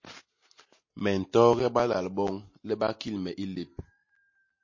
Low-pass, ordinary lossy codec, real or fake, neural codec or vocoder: 7.2 kHz; MP3, 32 kbps; real; none